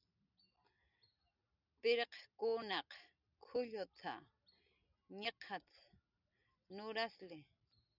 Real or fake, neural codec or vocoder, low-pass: real; none; 5.4 kHz